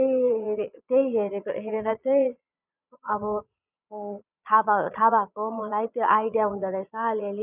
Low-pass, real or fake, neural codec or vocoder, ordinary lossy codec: 3.6 kHz; fake; vocoder, 22.05 kHz, 80 mel bands, Vocos; none